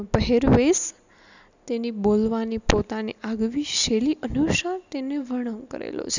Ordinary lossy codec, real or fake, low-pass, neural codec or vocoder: none; real; 7.2 kHz; none